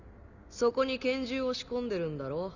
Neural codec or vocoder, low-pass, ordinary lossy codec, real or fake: none; 7.2 kHz; none; real